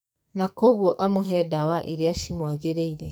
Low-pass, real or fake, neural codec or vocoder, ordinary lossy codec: none; fake; codec, 44.1 kHz, 2.6 kbps, SNAC; none